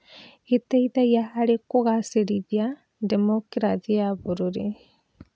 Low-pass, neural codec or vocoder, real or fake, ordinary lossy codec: none; none; real; none